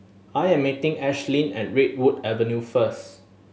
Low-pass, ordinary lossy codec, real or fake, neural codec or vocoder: none; none; real; none